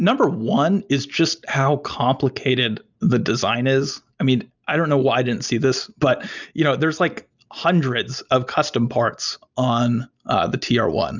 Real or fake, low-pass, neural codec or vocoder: real; 7.2 kHz; none